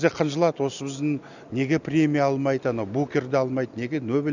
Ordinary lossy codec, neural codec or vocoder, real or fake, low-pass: none; none; real; 7.2 kHz